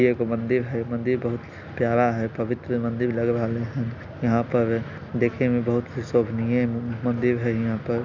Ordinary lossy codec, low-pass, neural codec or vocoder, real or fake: Opus, 64 kbps; 7.2 kHz; none; real